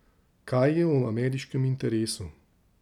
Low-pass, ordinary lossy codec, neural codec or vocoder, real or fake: 19.8 kHz; none; none; real